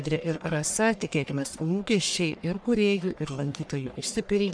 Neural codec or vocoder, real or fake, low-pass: codec, 44.1 kHz, 1.7 kbps, Pupu-Codec; fake; 9.9 kHz